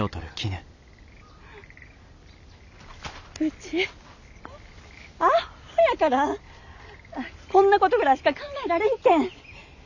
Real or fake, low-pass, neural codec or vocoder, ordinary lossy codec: real; 7.2 kHz; none; none